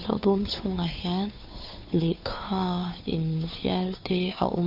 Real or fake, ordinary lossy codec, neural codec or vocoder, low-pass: fake; none; codec, 16 kHz, 2 kbps, FunCodec, trained on Chinese and English, 25 frames a second; 5.4 kHz